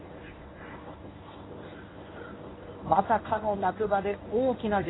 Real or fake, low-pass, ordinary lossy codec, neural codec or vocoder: fake; 7.2 kHz; AAC, 16 kbps; codec, 24 kHz, 0.9 kbps, WavTokenizer, medium speech release version 1